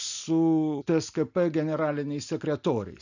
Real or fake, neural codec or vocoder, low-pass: real; none; 7.2 kHz